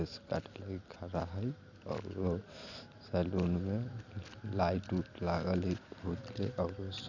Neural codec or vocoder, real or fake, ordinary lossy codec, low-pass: none; real; none; 7.2 kHz